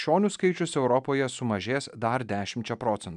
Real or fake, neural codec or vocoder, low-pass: real; none; 10.8 kHz